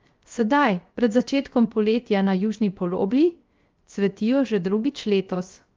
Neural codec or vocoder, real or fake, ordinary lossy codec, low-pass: codec, 16 kHz, 0.3 kbps, FocalCodec; fake; Opus, 32 kbps; 7.2 kHz